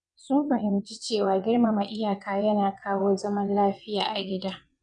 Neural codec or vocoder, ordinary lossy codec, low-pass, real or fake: vocoder, 22.05 kHz, 80 mel bands, WaveNeXt; none; 9.9 kHz; fake